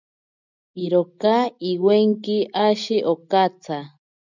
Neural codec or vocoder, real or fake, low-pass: none; real; 7.2 kHz